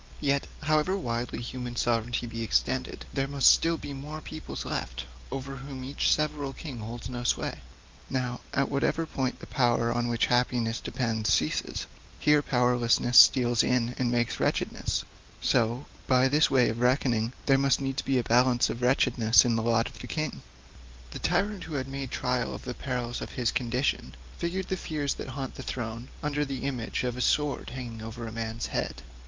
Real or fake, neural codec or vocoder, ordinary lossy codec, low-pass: real; none; Opus, 24 kbps; 7.2 kHz